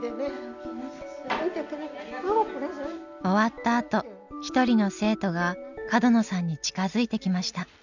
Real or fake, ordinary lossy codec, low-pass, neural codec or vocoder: real; none; 7.2 kHz; none